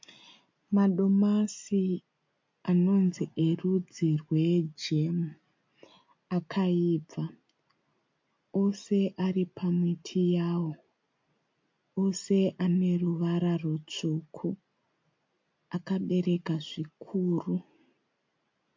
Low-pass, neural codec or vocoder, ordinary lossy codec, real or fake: 7.2 kHz; none; MP3, 48 kbps; real